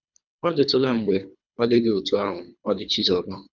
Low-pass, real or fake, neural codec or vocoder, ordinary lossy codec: 7.2 kHz; fake; codec, 24 kHz, 3 kbps, HILCodec; none